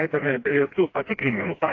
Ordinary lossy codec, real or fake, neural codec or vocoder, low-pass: AAC, 32 kbps; fake; codec, 16 kHz, 1 kbps, FreqCodec, smaller model; 7.2 kHz